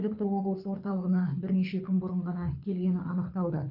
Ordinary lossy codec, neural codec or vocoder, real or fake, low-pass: none; codec, 24 kHz, 6 kbps, HILCodec; fake; 5.4 kHz